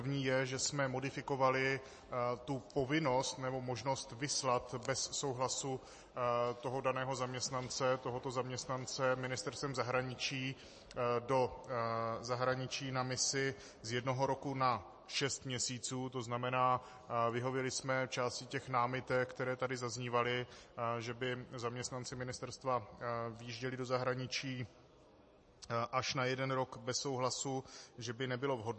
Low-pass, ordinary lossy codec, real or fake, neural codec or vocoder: 10.8 kHz; MP3, 32 kbps; real; none